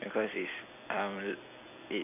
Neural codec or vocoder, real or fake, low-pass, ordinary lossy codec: vocoder, 44.1 kHz, 128 mel bands every 256 samples, BigVGAN v2; fake; 3.6 kHz; none